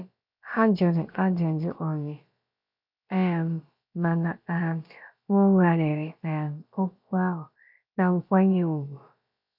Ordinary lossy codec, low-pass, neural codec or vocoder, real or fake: none; 5.4 kHz; codec, 16 kHz, about 1 kbps, DyCAST, with the encoder's durations; fake